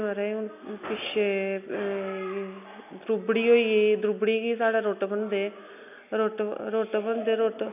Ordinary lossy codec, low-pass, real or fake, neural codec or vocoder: none; 3.6 kHz; real; none